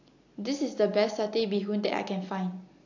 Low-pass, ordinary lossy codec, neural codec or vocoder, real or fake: 7.2 kHz; AAC, 48 kbps; none; real